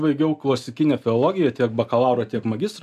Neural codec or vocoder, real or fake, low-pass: none; real; 14.4 kHz